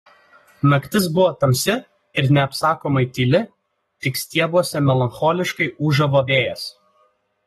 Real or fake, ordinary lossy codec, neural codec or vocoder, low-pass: fake; AAC, 32 kbps; codec, 44.1 kHz, 7.8 kbps, Pupu-Codec; 19.8 kHz